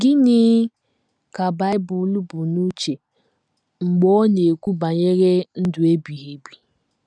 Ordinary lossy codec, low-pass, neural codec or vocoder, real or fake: none; 9.9 kHz; none; real